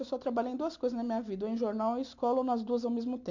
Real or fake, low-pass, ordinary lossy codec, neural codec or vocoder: real; 7.2 kHz; MP3, 64 kbps; none